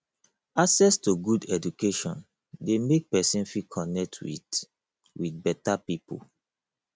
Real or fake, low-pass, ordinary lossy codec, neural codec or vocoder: real; none; none; none